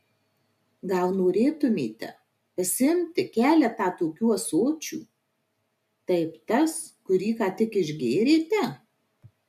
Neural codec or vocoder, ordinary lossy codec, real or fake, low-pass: none; MP3, 96 kbps; real; 14.4 kHz